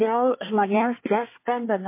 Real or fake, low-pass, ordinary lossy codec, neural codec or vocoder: fake; 3.6 kHz; MP3, 24 kbps; codec, 24 kHz, 1 kbps, SNAC